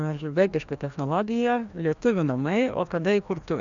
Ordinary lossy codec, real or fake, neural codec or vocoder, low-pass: Opus, 64 kbps; fake; codec, 16 kHz, 1 kbps, FreqCodec, larger model; 7.2 kHz